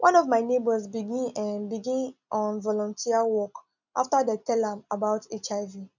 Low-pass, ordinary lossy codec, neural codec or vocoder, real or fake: 7.2 kHz; none; none; real